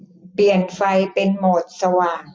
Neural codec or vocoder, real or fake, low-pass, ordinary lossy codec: none; real; none; none